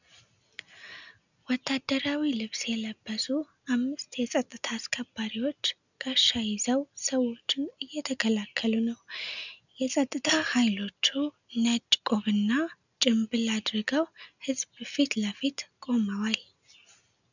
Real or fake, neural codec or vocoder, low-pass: real; none; 7.2 kHz